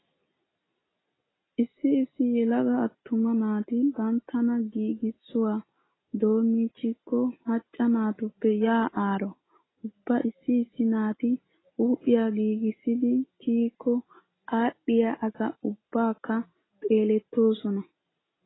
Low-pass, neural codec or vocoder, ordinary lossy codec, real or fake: 7.2 kHz; none; AAC, 16 kbps; real